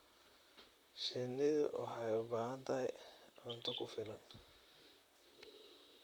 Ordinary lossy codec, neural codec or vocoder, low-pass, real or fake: Opus, 64 kbps; vocoder, 44.1 kHz, 128 mel bands, Pupu-Vocoder; 19.8 kHz; fake